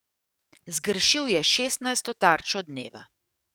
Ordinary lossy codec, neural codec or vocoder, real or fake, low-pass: none; codec, 44.1 kHz, 7.8 kbps, DAC; fake; none